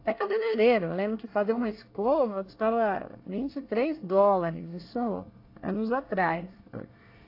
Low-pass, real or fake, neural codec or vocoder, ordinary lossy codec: 5.4 kHz; fake; codec, 24 kHz, 1 kbps, SNAC; AAC, 32 kbps